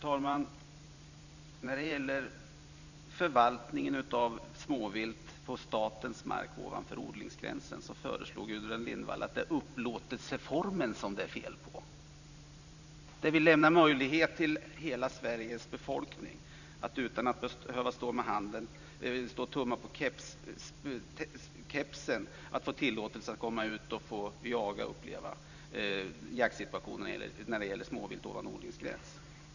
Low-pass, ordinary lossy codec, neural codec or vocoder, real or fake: 7.2 kHz; none; vocoder, 44.1 kHz, 128 mel bands every 512 samples, BigVGAN v2; fake